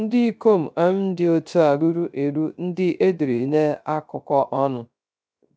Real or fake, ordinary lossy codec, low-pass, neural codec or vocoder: fake; none; none; codec, 16 kHz, 0.3 kbps, FocalCodec